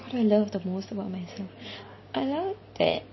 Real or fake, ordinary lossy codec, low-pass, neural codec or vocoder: real; MP3, 24 kbps; 7.2 kHz; none